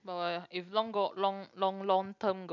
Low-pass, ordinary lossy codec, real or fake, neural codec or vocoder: 7.2 kHz; none; real; none